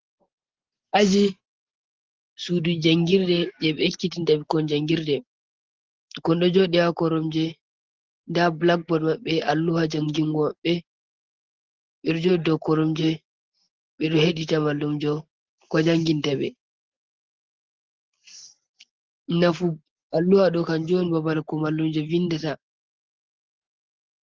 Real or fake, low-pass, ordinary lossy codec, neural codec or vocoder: real; 7.2 kHz; Opus, 16 kbps; none